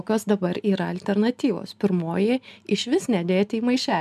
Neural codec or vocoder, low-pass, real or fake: none; 14.4 kHz; real